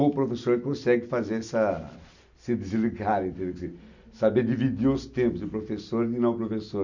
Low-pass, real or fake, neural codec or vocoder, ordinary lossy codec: 7.2 kHz; real; none; none